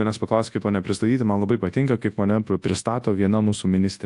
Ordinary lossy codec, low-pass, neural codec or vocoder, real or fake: AAC, 64 kbps; 10.8 kHz; codec, 24 kHz, 0.9 kbps, WavTokenizer, large speech release; fake